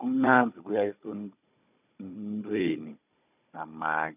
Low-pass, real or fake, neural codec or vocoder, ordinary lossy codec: 3.6 kHz; fake; codec, 16 kHz, 16 kbps, FunCodec, trained on Chinese and English, 50 frames a second; none